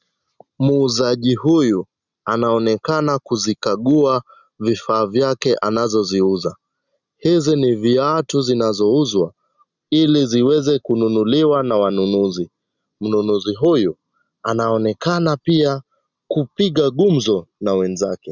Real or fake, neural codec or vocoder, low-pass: real; none; 7.2 kHz